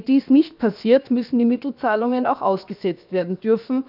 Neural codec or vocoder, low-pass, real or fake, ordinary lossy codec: codec, 16 kHz, 0.7 kbps, FocalCodec; 5.4 kHz; fake; none